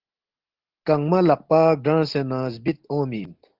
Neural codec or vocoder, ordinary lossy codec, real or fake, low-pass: none; Opus, 16 kbps; real; 5.4 kHz